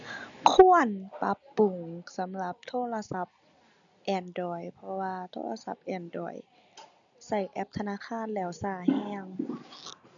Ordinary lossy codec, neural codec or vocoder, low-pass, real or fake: none; none; 7.2 kHz; real